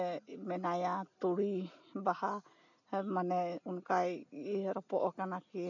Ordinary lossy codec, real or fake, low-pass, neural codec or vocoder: none; real; 7.2 kHz; none